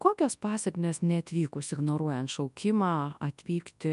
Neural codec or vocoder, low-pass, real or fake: codec, 24 kHz, 0.9 kbps, WavTokenizer, large speech release; 10.8 kHz; fake